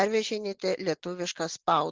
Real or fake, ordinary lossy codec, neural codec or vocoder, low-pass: real; Opus, 16 kbps; none; 7.2 kHz